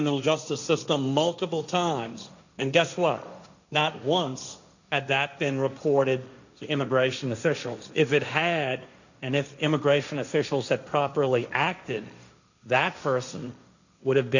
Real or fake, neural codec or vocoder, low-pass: fake; codec, 16 kHz, 1.1 kbps, Voila-Tokenizer; 7.2 kHz